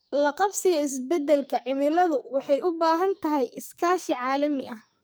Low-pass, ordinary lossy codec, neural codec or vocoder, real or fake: none; none; codec, 44.1 kHz, 2.6 kbps, SNAC; fake